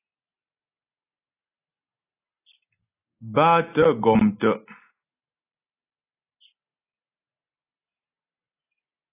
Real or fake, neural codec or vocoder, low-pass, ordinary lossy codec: real; none; 3.6 kHz; AAC, 16 kbps